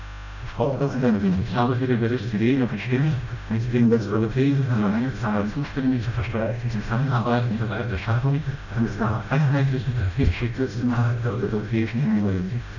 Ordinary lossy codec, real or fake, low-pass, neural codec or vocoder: none; fake; 7.2 kHz; codec, 16 kHz, 0.5 kbps, FreqCodec, smaller model